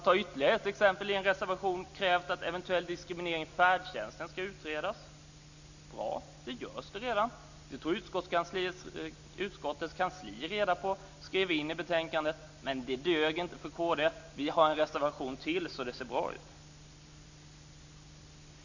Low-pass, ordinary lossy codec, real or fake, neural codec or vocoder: 7.2 kHz; none; real; none